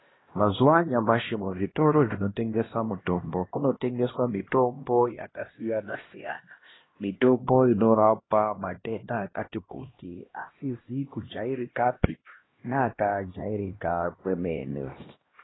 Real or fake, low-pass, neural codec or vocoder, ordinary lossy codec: fake; 7.2 kHz; codec, 16 kHz, 1 kbps, X-Codec, HuBERT features, trained on LibriSpeech; AAC, 16 kbps